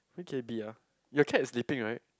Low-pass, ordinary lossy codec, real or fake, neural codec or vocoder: none; none; real; none